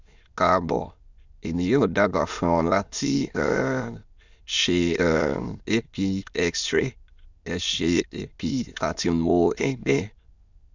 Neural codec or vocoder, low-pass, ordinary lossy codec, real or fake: autoencoder, 22.05 kHz, a latent of 192 numbers a frame, VITS, trained on many speakers; 7.2 kHz; Opus, 64 kbps; fake